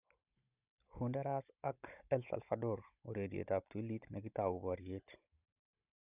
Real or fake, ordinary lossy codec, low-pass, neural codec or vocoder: fake; none; 3.6 kHz; codec, 16 kHz, 16 kbps, FunCodec, trained on Chinese and English, 50 frames a second